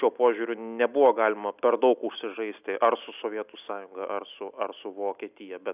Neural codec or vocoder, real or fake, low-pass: none; real; 3.6 kHz